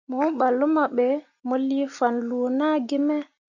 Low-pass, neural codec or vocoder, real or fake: 7.2 kHz; none; real